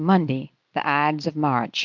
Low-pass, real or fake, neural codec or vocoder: 7.2 kHz; real; none